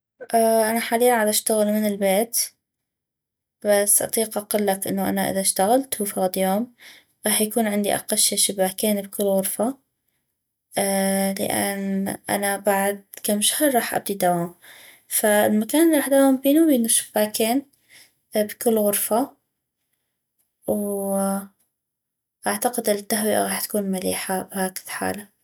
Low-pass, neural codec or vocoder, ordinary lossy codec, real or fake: none; none; none; real